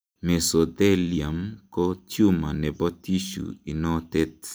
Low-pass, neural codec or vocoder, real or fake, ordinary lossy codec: none; none; real; none